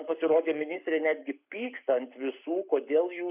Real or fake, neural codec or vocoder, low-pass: fake; codec, 44.1 kHz, 7.8 kbps, Pupu-Codec; 3.6 kHz